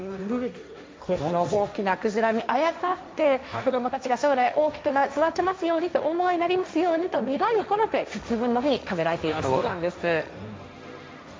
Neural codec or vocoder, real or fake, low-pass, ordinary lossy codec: codec, 16 kHz, 1.1 kbps, Voila-Tokenizer; fake; none; none